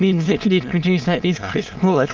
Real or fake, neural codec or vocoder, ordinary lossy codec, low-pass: fake; autoencoder, 22.05 kHz, a latent of 192 numbers a frame, VITS, trained on many speakers; Opus, 32 kbps; 7.2 kHz